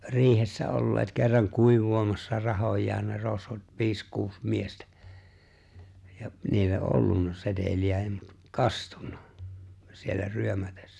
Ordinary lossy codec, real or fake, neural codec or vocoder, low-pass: none; real; none; none